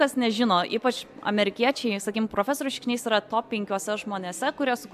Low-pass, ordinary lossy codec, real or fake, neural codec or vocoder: 14.4 kHz; AAC, 96 kbps; real; none